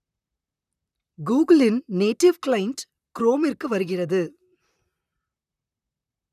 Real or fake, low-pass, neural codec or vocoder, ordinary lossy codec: fake; 14.4 kHz; vocoder, 44.1 kHz, 128 mel bands, Pupu-Vocoder; none